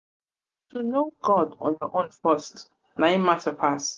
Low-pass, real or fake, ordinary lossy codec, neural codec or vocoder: 7.2 kHz; real; Opus, 32 kbps; none